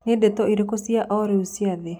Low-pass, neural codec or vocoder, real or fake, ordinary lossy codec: none; none; real; none